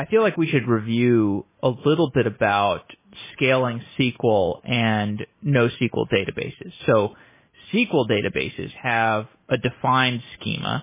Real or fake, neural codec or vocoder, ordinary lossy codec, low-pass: real; none; MP3, 16 kbps; 3.6 kHz